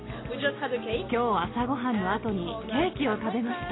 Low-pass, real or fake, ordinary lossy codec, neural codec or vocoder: 7.2 kHz; real; AAC, 16 kbps; none